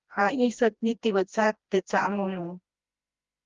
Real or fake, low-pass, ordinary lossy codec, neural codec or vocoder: fake; 7.2 kHz; Opus, 32 kbps; codec, 16 kHz, 1 kbps, FreqCodec, smaller model